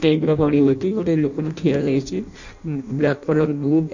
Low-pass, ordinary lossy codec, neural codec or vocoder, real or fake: 7.2 kHz; none; codec, 16 kHz in and 24 kHz out, 0.6 kbps, FireRedTTS-2 codec; fake